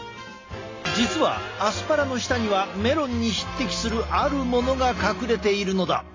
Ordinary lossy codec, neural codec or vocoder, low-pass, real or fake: AAC, 32 kbps; none; 7.2 kHz; real